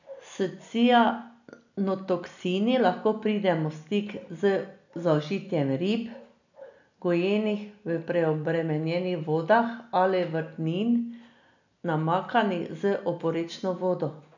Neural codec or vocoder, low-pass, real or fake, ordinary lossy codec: none; 7.2 kHz; real; none